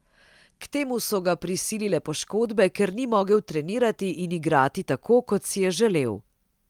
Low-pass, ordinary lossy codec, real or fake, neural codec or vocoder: 19.8 kHz; Opus, 32 kbps; real; none